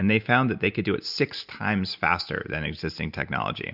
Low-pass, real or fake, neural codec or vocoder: 5.4 kHz; real; none